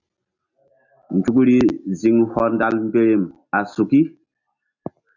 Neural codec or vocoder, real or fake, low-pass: none; real; 7.2 kHz